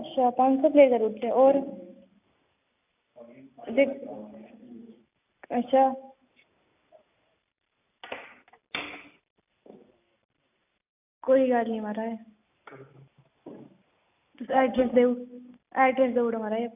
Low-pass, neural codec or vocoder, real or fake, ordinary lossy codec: 3.6 kHz; none; real; AAC, 32 kbps